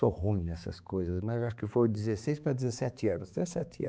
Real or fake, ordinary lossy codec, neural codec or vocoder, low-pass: fake; none; codec, 16 kHz, 4 kbps, X-Codec, HuBERT features, trained on balanced general audio; none